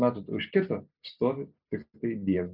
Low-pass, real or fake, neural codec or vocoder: 5.4 kHz; real; none